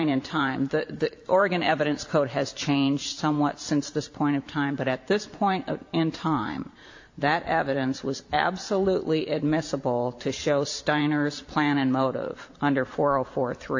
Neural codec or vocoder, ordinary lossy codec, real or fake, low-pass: vocoder, 44.1 kHz, 128 mel bands every 512 samples, BigVGAN v2; AAC, 48 kbps; fake; 7.2 kHz